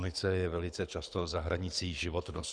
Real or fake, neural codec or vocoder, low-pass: fake; codec, 24 kHz, 6 kbps, HILCodec; 9.9 kHz